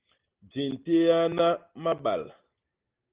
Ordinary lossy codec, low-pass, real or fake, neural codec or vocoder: Opus, 16 kbps; 3.6 kHz; real; none